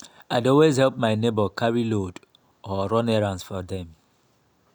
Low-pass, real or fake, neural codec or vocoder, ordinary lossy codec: none; real; none; none